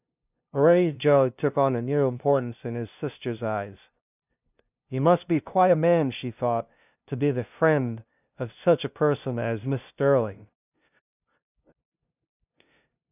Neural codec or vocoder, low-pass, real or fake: codec, 16 kHz, 0.5 kbps, FunCodec, trained on LibriTTS, 25 frames a second; 3.6 kHz; fake